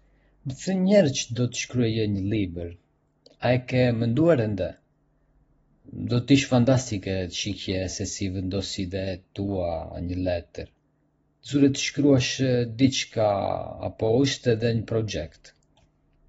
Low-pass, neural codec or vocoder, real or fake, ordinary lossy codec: 19.8 kHz; none; real; AAC, 24 kbps